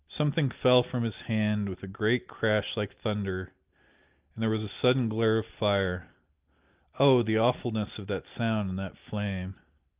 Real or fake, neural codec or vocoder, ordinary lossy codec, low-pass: real; none; Opus, 32 kbps; 3.6 kHz